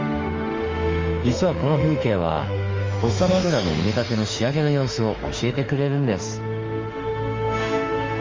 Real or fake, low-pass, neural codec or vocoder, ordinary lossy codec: fake; 7.2 kHz; autoencoder, 48 kHz, 32 numbers a frame, DAC-VAE, trained on Japanese speech; Opus, 32 kbps